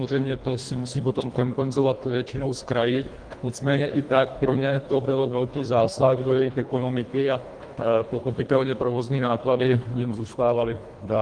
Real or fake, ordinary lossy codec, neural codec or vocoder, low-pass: fake; Opus, 24 kbps; codec, 24 kHz, 1.5 kbps, HILCodec; 9.9 kHz